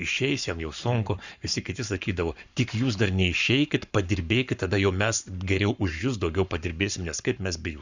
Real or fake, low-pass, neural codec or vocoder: fake; 7.2 kHz; codec, 44.1 kHz, 7.8 kbps, Pupu-Codec